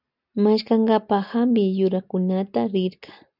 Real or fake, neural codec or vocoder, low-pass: real; none; 5.4 kHz